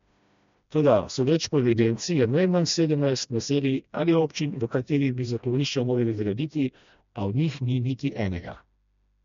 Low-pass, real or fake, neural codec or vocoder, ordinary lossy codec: 7.2 kHz; fake; codec, 16 kHz, 1 kbps, FreqCodec, smaller model; MP3, 64 kbps